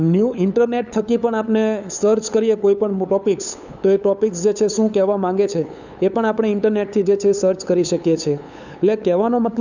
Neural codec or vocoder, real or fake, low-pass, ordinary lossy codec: codec, 16 kHz, 8 kbps, FunCodec, trained on LibriTTS, 25 frames a second; fake; 7.2 kHz; none